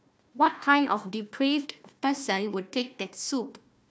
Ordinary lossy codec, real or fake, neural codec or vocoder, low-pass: none; fake; codec, 16 kHz, 1 kbps, FunCodec, trained on Chinese and English, 50 frames a second; none